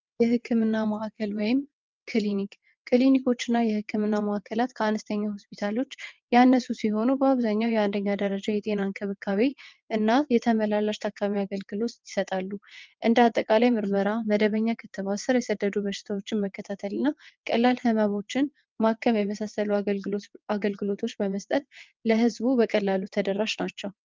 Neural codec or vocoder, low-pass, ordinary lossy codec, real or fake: vocoder, 22.05 kHz, 80 mel bands, WaveNeXt; 7.2 kHz; Opus, 24 kbps; fake